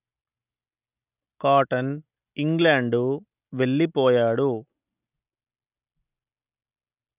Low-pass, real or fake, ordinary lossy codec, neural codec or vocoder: 3.6 kHz; real; none; none